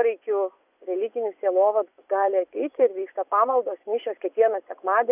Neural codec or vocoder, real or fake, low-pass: vocoder, 24 kHz, 100 mel bands, Vocos; fake; 3.6 kHz